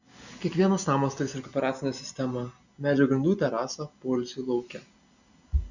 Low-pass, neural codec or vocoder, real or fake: 7.2 kHz; none; real